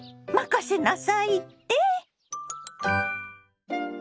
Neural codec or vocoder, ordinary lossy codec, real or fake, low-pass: none; none; real; none